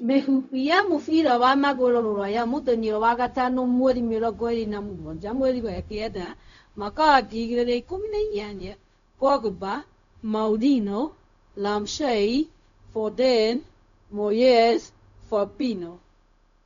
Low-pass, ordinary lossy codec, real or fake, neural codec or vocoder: 7.2 kHz; none; fake; codec, 16 kHz, 0.4 kbps, LongCat-Audio-Codec